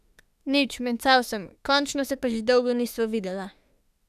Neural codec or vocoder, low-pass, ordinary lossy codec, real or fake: autoencoder, 48 kHz, 32 numbers a frame, DAC-VAE, trained on Japanese speech; 14.4 kHz; none; fake